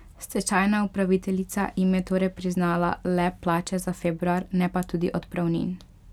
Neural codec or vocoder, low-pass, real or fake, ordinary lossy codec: none; 19.8 kHz; real; none